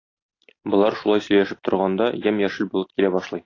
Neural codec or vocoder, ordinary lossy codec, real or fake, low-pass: none; AAC, 32 kbps; real; 7.2 kHz